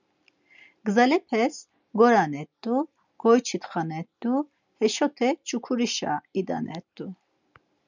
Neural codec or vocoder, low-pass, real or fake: none; 7.2 kHz; real